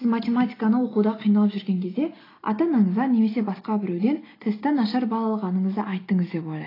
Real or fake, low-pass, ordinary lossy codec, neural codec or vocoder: real; 5.4 kHz; AAC, 24 kbps; none